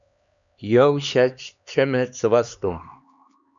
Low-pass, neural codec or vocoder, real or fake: 7.2 kHz; codec, 16 kHz, 2 kbps, X-Codec, HuBERT features, trained on LibriSpeech; fake